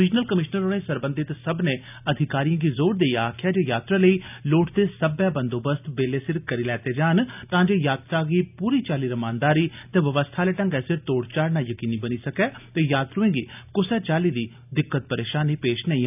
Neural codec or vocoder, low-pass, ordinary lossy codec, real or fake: none; 3.6 kHz; none; real